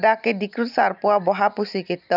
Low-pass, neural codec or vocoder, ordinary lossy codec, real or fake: 5.4 kHz; none; none; real